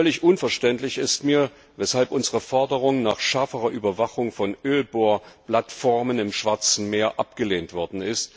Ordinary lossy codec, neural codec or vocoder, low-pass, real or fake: none; none; none; real